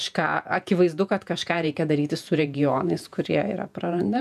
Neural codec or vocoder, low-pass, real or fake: vocoder, 48 kHz, 128 mel bands, Vocos; 14.4 kHz; fake